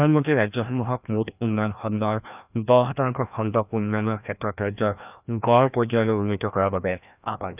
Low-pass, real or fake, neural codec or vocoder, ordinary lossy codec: 3.6 kHz; fake; codec, 16 kHz, 1 kbps, FreqCodec, larger model; none